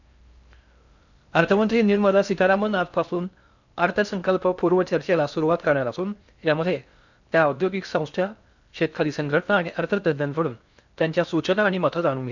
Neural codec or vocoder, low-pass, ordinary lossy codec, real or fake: codec, 16 kHz in and 24 kHz out, 0.6 kbps, FocalCodec, streaming, 4096 codes; 7.2 kHz; none; fake